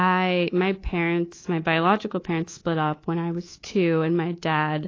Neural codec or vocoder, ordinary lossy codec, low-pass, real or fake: codec, 24 kHz, 3.1 kbps, DualCodec; AAC, 32 kbps; 7.2 kHz; fake